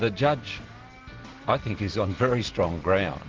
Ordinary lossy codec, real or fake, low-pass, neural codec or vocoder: Opus, 16 kbps; real; 7.2 kHz; none